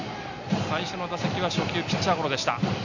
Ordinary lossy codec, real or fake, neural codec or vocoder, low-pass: none; real; none; 7.2 kHz